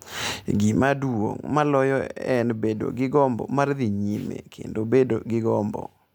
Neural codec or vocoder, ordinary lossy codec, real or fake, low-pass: none; none; real; none